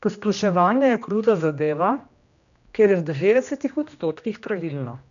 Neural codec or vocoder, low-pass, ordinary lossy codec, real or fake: codec, 16 kHz, 1 kbps, X-Codec, HuBERT features, trained on general audio; 7.2 kHz; none; fake